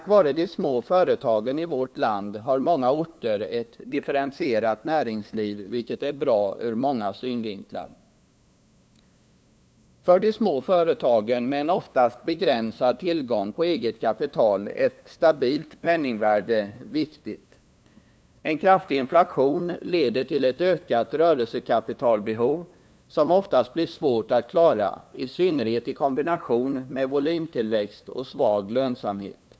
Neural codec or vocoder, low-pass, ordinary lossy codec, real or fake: codec, 16 kHz, 2 kbps, FunCodec, trained on LibriTTS, 25 frames a second; none; none; fake